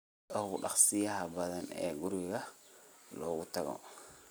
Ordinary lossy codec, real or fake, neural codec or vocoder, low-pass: none; real; none; none